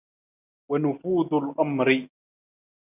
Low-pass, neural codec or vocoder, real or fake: 3.6 kHz; none; real